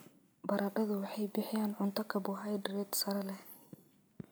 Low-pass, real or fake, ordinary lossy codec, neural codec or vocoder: none; real; none; none